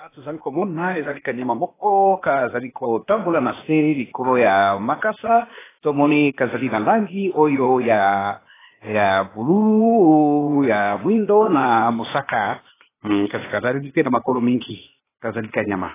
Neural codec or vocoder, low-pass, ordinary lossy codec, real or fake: codec, 16 kHz, 0.8 kbps, ZipCodec; 3.6 kHz; AAC, 16 kbps; fake